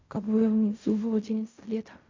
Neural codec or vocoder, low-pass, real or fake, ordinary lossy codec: codec, 16 kHz in and 24 kHz out, 0.4 kbps, LongCat-Audio-Codec, fine tuned four codebook decoder; 7.2 kHz; fake; MP3, 48 kbps